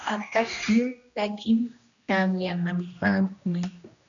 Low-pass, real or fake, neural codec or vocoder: 7.2 kHz; fake; codec, 16 kHz, 1 kbps, X-Codec, HuBERT features, trained on general audio